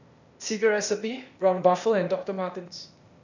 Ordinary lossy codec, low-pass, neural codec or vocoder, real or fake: none; 7.2 kHz; codec, 16 kHz, 0.8 kbps, ZipCodec; fake